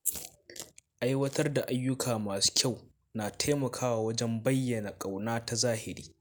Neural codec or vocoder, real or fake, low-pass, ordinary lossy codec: none; real; none; none